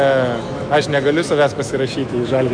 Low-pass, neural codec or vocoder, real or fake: 9.9 kHz; autoencoder, 48 kHz, 128 numbers a frame, DAC-VAE, trained on Japanese speech; fake